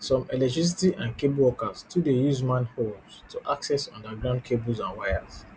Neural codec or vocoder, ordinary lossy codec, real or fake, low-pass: none; none; real; none